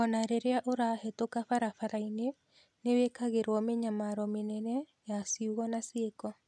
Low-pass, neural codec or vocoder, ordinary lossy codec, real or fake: none; none; none; real